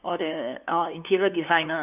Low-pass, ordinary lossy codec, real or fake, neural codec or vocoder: 3.6 kHz; none; fake; codec, 16 kHz, 2 kbps, FunCodec, trained on LibriTTS, 25 frames a second